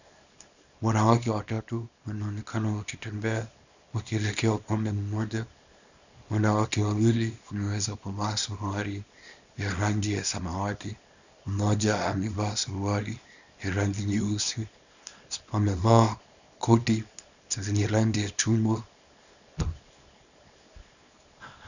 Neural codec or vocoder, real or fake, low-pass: codec, 24 kHz, 0.9 kbps, WavTokenizer, small release; fake; 7.2 kHz